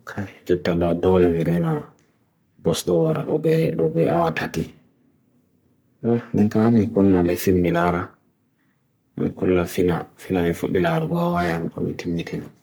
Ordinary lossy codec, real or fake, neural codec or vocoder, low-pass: none; fake; codec, 44.1 kHz, 3.4 kbps, Pupu-Codec; none